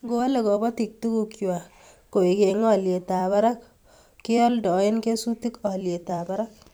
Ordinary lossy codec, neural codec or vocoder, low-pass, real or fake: none; vocoder, 44.1 kHz, 128 mel bands every 256 samples, BigVGAN v2; none; fake